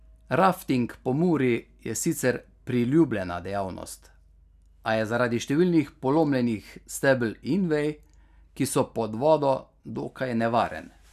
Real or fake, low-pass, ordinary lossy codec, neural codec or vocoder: real; 14.4 kHz; none; none